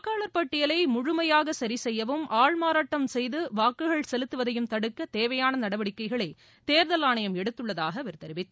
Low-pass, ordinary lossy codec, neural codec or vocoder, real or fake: none; none; none; real